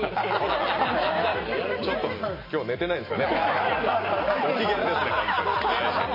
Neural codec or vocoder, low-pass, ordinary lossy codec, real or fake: none; 5.4 kHz; MP3, 24 kbps; real